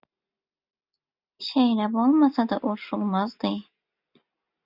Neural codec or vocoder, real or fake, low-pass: none; real; 5.4 kHz